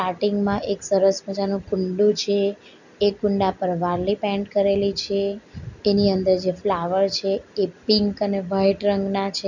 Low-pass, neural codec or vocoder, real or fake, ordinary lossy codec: 7.2 kHz; none; real; none